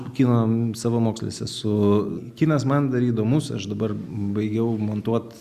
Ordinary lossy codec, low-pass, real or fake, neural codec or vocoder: Opus, 64 kbps; 14.4 kHz; real; none